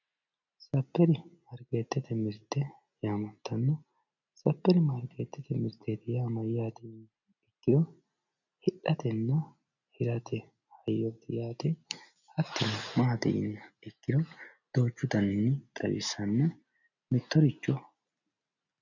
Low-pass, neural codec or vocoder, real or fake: 7.2 kHz; none; real